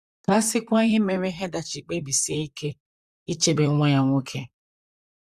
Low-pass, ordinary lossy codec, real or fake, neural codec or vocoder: 14.4 kHz; Opus, 64 kbps; fake; vocoder, 44.1 kHz, 128 mel bands, Pupu-Vocoder